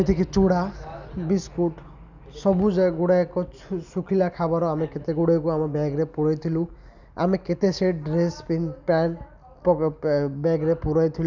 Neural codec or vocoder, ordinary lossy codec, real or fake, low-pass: none; none; real; 7.2 kHz